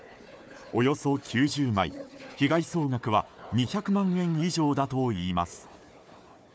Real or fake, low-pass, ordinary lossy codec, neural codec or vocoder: fake; none; none; codec, 16 kHz, 4 kbps, FunCodec, trained on Chinese and English, 50 frames a second